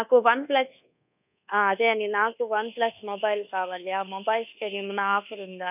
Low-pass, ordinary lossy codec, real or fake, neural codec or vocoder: 3.6 kHz; none; fake; codec, 24 kHz, 1.2 kbps, DualCodec